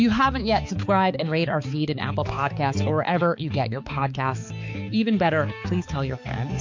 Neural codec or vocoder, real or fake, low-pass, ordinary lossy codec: codec, 16 kHz, 4 kbps, X-Codec, HuBERT features, trained on balanced general audio; fake; 7.2 kHz; MP3, 48 kbps